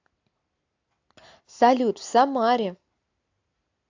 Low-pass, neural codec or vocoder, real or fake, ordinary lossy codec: 7.2 kHz; none; real; AAC, 48 kbps